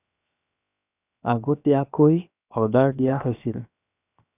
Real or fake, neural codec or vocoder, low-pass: fake; codec, 16 kHz, 0.7 kbps, FocalCodec; 3.6 kHz